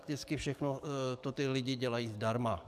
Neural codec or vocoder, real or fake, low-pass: codec, 44.1 kHz, 7.8 kbps, DAC; fake; 14.4 kHz